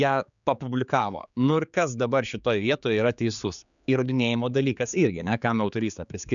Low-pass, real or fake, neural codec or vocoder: 7.2 kHz; fake; codec, 16 kHz, 4 kbps, X-Codec, HuBERT features, trained on general audio